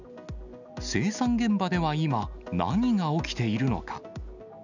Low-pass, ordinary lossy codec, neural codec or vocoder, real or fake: 7.2 kHz; none; none; real